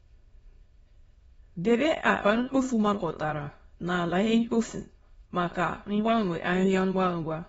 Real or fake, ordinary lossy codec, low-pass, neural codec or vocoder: fake; AAC, 24 kbps; 9.9 kHz; autoencoder, 22.05 kHz, a latent of 192 numbers a frame, VITS, trained on many speakers